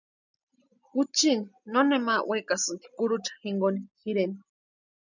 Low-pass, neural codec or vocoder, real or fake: 7.2 kHz; none; real